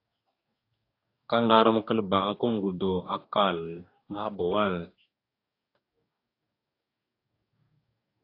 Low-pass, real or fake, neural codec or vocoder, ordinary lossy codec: 5.4 kHz; fake; codec, 44.1 kHz, 2.6 kbps, DAC; AAC, 32 kbps